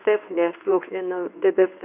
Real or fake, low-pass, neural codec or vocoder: fake; 3.6 kHz; codec, 24 kHz, 0.9 kbps, WavTokenizer, medium speech release version 1